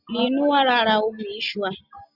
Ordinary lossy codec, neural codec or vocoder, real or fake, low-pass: Opus, 64 kbps; none; real; 5.4 kHz